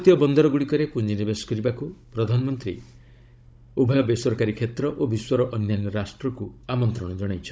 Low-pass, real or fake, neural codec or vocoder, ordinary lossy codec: none; fake; codec, 16 kHz, 16 kbps, FunCodec, trained on Chinese and English, 50 frames a second; none